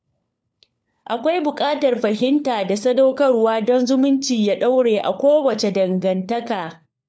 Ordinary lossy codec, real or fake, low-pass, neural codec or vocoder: none; fake; none; codec, 16 kHz, 4 kbps, FunCodec, trained on LibriTTS, 50 frames a second